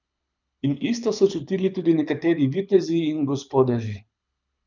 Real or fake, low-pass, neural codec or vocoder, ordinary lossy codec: fake; 7.2 kHz; codec, 24 kHz, 6 kbps, HILCodec; none